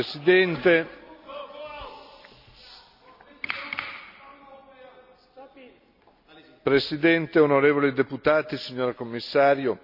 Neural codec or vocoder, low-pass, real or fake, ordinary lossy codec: none; 5.4 kHz; real; none